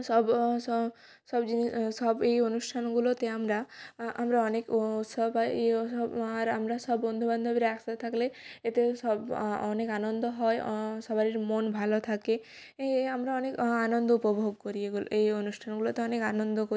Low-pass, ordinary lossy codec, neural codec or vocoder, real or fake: none; none; none; real